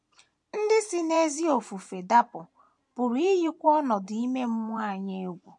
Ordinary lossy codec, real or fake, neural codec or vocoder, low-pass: MP3, 64 kbps; fake; vocoder, 44.1 kHz, 128 mel bands every 512 samples, BigVGAN v2; 10.8 kHz